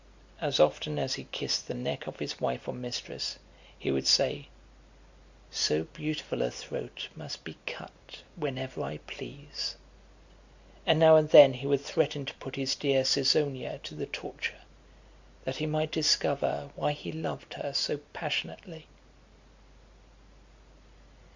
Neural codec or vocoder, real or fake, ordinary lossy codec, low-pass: none; real; Opus, 64 kbps; 7.2 kHz